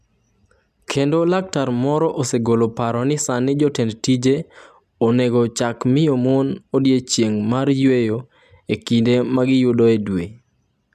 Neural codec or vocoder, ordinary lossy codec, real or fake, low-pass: none; none; real; 14.4 kHz